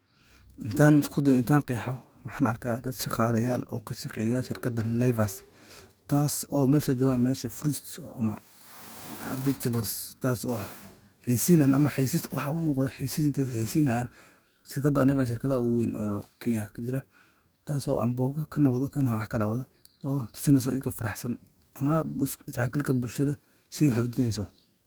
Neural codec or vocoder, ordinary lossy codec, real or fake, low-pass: codec, 44.1 kHz, 2.6 kbps, DAC; none; fake; none